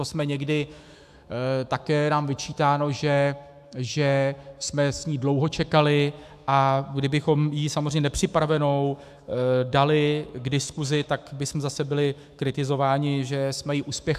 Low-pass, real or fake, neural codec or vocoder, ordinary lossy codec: 14.4 kHz; fake; autoencoder, 48 kHz, 128 numbers a frame, DAC-VAE, trained on Japanese speech; AAC, 96 kbps